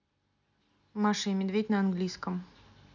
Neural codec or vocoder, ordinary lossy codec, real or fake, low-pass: none; none; real; 7.2 kHz